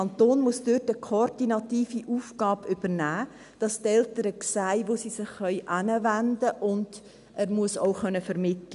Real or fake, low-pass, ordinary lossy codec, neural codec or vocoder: real; 10.8 kHz; none; none